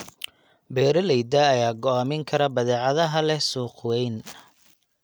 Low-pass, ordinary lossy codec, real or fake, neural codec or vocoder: none; none; real; none